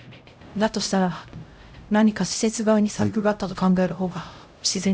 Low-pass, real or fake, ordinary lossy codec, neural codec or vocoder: none; fake; none; codec, 16 kHz, 0.5 kbps, X-Codec, HuBERT features, trained on LibriSpeech